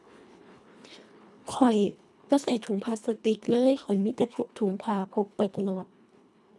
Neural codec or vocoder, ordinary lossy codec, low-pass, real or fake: codec, 24 kHz, 1.5 kbps, HILCodec; none; none; fake